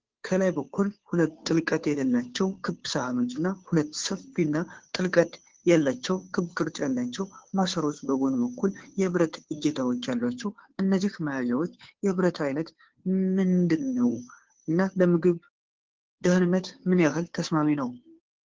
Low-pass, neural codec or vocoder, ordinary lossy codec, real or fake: 7.2 kHz; codec, 16 kHz, 2 kbps, FunCodec, trained on Chinese and English, 25 frames a second; Opus, 16 kbps; fake